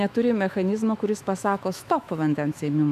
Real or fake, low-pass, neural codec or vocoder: fake; 14.4 kHz; autoencoder, 48 kHz, 128 numbers a frame, DAC-VAE, trained on Japanese speech